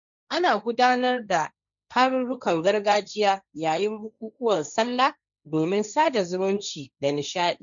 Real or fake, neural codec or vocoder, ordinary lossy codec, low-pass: fake; codec, 16 kHz, 1.1 kbps, Voila-Tokenizer; none; 7.2 kHz